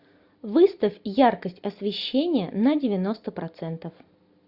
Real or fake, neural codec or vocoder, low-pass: real; none; 5.4 kHz